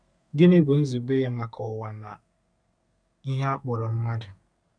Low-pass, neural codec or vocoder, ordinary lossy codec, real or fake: 9.9 kHz; codec, 32 kHz, 1.9 kbps, SNAC; none; fake